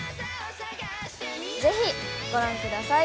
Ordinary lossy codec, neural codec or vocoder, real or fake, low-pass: none; none; real; none